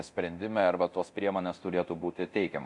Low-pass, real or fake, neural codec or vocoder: 10.8 kHz; fake; codec, 24 kHz, 0.9 kbps, DualCodec